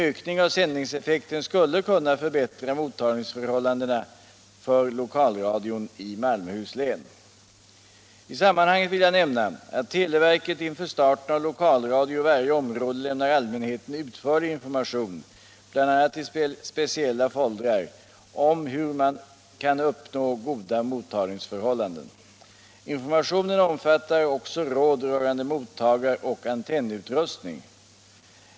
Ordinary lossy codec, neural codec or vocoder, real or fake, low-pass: none; none; real; none